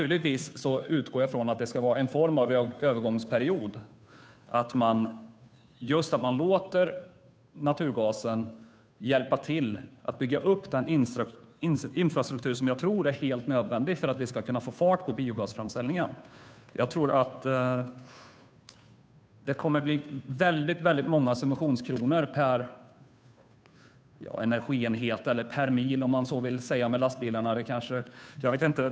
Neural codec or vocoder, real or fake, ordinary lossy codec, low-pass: codec, 16 kHz, 2 kbps, FunCodec, trained on Chinese and English, 25 frames a second; fake; none; none